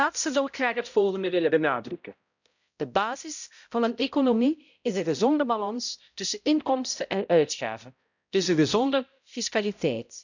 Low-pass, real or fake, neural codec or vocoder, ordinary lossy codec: 7.2 kHz; fake; codec, 16 kHz, 0.5 kbps, X-Codec, HuBERT features, trained on balanced general audio; none